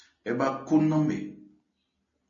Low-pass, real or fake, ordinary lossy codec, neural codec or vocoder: 7.2 kHz; real; MP3, 32 kbps; none